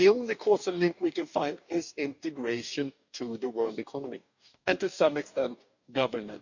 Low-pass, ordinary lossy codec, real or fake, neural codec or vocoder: 7.2 kHz; none; fake; codec, 44.1 kHz, 2.6 kbps, DAC